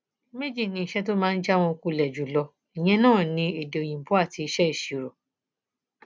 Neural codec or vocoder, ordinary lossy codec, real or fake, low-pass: none; none; real; none